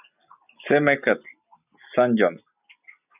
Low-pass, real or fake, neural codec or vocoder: 3.6 kHz; real; none